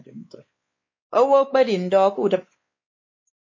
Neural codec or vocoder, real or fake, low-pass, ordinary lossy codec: codec, 16 kHz, 2 kbps, X-Codec, WavLM features, trained on Multilingual LibriSpeech; fake; 7.2 kHz; MP3, 32 kbps